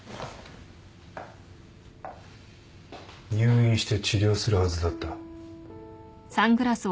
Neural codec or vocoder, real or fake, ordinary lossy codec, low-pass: none; real; none; none